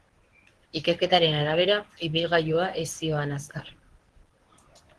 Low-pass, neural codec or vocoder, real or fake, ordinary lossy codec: 10.8 kHz; codec, 24 kHz, 0.9 kbps, WavTokenizer, medium speech release version 1; fake; Opus, 16 kbps